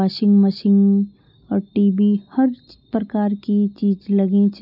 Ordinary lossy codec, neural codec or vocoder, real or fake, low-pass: none; none; real; 5.4 kHz